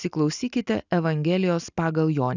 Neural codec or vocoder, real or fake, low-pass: none; real; 7.2 kHz